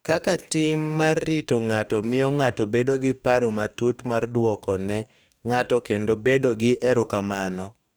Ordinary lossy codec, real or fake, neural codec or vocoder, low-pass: none; fake; codec, 44.1 kHz, 2.6 kbps, DAC; none